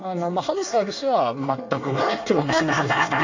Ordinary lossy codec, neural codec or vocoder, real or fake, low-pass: AAC, 48 kbps; codec, 24 kHz, 1 kbps, SNAC; fake; 7.2 kHz